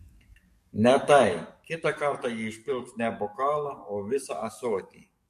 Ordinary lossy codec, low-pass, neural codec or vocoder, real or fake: MP3, 64 kbps; 14.4 kHz; codec, 44.1 kHz, 7.8 kbps, DAC; fake